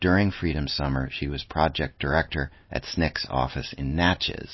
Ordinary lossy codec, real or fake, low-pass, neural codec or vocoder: MP3, 24 kbps; real; 7.2 kHz; none